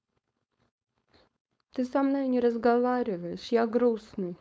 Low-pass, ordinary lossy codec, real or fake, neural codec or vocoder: none; none; fake; codec, 16 kHz, 4.8 kbps, FACodec